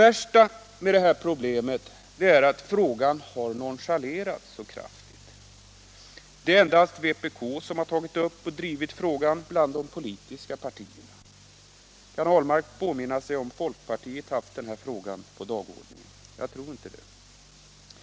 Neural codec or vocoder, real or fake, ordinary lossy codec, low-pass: none; real; none; none